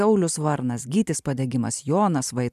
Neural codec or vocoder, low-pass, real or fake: vocoder, 44.1 kHz, 128 mel bands every 512 samples, BigVGAN v2; 14.4 kHz; fake